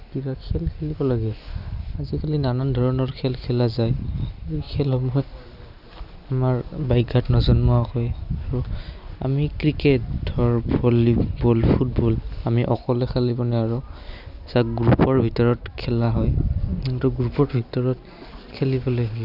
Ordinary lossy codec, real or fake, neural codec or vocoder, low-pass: none; real; none; 5.4 kHz